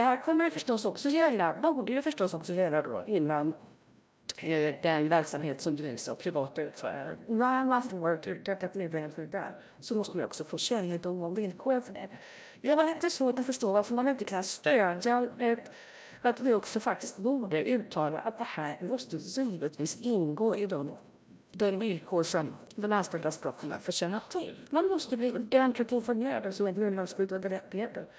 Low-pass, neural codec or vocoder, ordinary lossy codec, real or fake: none; codec, 16 kHz, 0.5 kbps, FreqCodec, larger model; none; fake